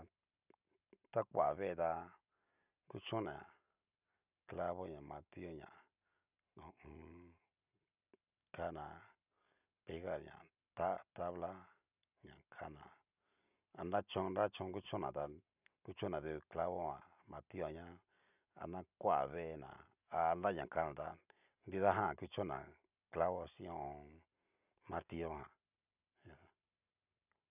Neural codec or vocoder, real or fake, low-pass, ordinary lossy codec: none; real; 3.6 kHz; none